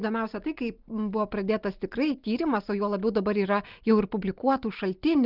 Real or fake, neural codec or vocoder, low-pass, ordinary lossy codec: real; none; 5.4 kHz; Opus, 24 kbps